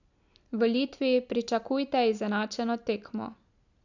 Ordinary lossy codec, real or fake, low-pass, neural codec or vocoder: none; real; 7.2 kHz; none